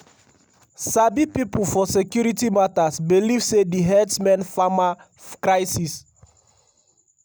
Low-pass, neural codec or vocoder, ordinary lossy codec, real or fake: none; none; none; real